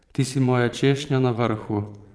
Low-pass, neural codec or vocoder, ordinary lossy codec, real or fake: none; vocoder, 22.05 kHz, 80 mel bands, Vocos; none; fake